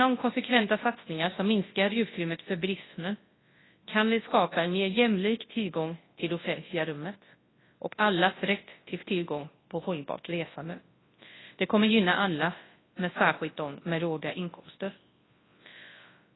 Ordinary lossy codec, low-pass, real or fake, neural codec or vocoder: AAC, 16 kbps; 7.2 kHz; fake; codec, 24 kHz, 0.9 kbps, WavTokenizer, large speech release